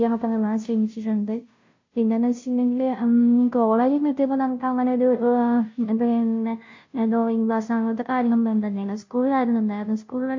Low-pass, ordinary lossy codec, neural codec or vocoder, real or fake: 7.2 kHz; MP3, 48 kbps; codec, 16 kHz, 0.5 kbps, FunCodec, trained on Chinese and English, 25 frames a second; fake